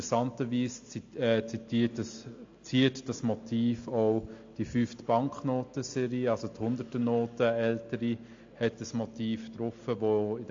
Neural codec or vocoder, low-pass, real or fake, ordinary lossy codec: none; 7.2 kHz; real; AAC, 48 kbps